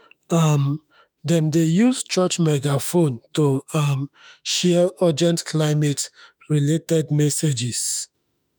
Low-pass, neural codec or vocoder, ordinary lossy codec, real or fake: none; autoencoder, 48 kHz, 32 numbers a frame, DAC-VAE, trained on Japanese speech; none; fake